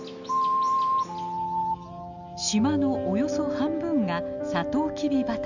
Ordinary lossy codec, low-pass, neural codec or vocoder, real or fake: none; 7.2 kHz; none; real